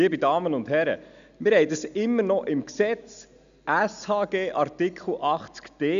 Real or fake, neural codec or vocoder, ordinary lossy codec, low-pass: real; none; none; 7.2 kHz